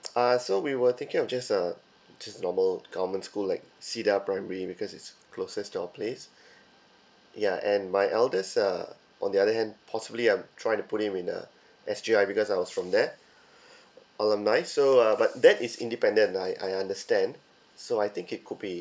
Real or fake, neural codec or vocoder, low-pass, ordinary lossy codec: real; none; none; none